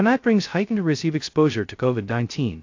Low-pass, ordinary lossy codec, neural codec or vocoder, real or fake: 7.2 kHz; AAC, 48 kbps; codec, 16 kHz, 0.2 kbps, FocalCodec; fake